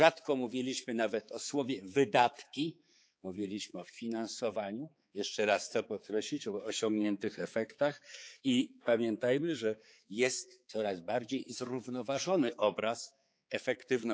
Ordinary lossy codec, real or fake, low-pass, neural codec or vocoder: none; fake; none; codec, 16 kHz, 4 kbps, X-Codec, HuBERT features, trained on balanced general audio